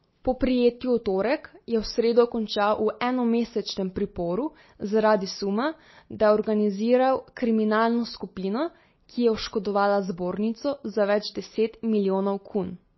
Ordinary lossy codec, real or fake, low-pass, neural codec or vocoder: MP3, 24 kbps; real; 7.2 kHz; none